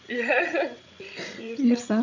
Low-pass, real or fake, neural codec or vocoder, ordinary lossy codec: 7.2 kHz; fake; codec, 16 kHz, 16 kbps, FreqCodec, larger model; none